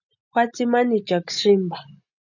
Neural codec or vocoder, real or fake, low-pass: none; real; 7.2 kHz